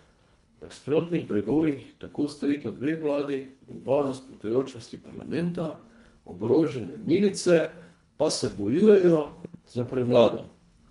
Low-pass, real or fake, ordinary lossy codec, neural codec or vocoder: 10.8 kHz; fake; MP3, 64 kbps; codec, 24 kHz, 1.5 kbps, HILCodec